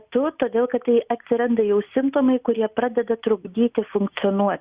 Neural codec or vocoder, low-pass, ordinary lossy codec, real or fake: none; 3.6 kHz; Opus, 64 kbps; real